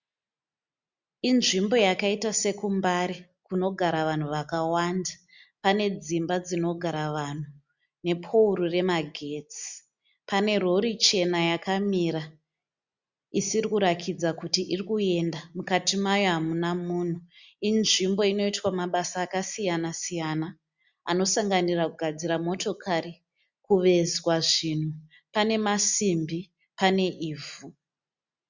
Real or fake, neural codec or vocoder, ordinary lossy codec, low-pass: real; none; Opus, 64 kbps; 7.2 kHz